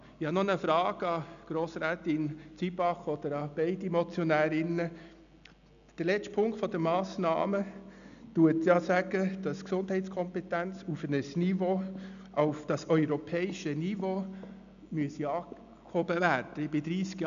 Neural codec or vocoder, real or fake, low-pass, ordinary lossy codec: none; real; 7.2 kHz; none